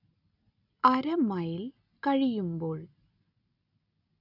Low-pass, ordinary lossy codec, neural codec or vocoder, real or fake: 5.4 kHz; none; none; real